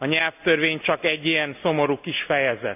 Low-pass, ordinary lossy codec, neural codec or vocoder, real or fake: 3.6 kHz; none; none; real